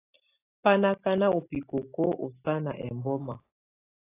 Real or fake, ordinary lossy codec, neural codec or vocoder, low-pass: real; AAC, 24 kbps; none; 3.6 kHz